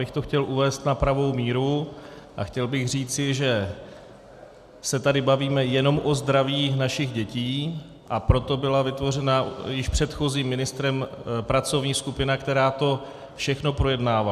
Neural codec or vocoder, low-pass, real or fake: none; 14.4 kHz; real